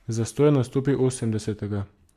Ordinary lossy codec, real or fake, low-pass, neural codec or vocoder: MP3, 96 kbps; real; 14.4 kHz; none